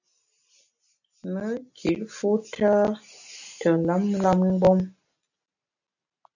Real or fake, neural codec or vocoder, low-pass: real; none; 7.2 kHz